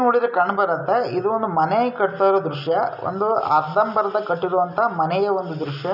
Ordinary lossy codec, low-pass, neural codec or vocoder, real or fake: none; 5.4 kHz; none; real